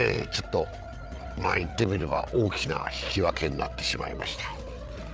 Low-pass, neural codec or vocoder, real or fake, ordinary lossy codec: none; codec, 16 kHz, 8 kbps, FreqCodec, larger model; fake; none